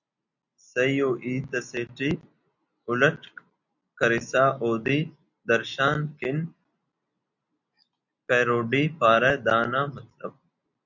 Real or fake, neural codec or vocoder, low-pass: real; none; 7.2 kHz